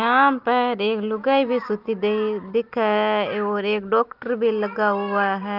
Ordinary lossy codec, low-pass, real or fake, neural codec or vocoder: Opus, 32 kbps; 5.4 kHz; real; none